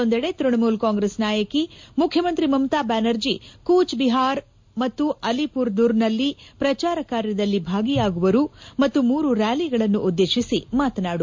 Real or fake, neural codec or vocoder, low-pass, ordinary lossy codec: real; none; 7.2 kHz; MP3, 48 kbps